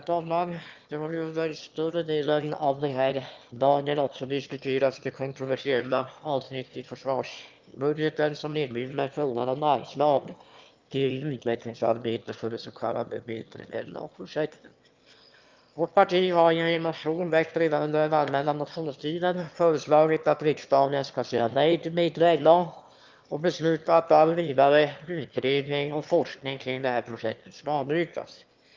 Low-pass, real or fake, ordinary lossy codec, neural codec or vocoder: 7.2 kHz; fake; Opus, 32 kbps; autoencoder, 22.05 kHz, a latent of 192 numbers a frame, VITS, trained on one speaker